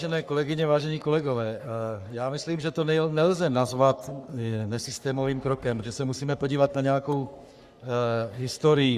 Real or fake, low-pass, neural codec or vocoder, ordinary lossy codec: fake; 14.4 kHz; codec, 44.1 kHz, 3.4 kbps, Pupu-Codec; Opus, 64 kbps